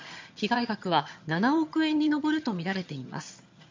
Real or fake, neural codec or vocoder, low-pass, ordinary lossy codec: fake; vocoder, 22.05 kHz, 80 mel bands, HiFi-GAN; 7.2 kHz; MP3, 48 kbps